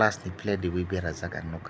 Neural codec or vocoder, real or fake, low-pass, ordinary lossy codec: none; real; none; none